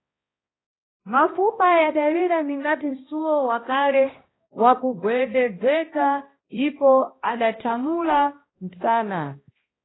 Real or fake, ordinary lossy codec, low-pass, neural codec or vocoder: fake; AAC, 16 kbps; 7.2 kHz; codec, 16 kHz, 1 kbps, X-Codec, HuBERT features, trained on balanced general audio